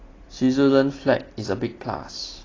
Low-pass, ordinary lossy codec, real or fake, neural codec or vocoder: 7.2 kHz; AAC, 32 kbps; real; none